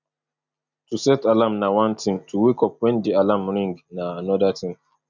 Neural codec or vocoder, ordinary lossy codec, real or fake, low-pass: none; none; real; 7.2 kHz